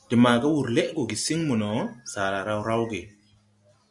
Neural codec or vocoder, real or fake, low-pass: none; real; 10.8 kHz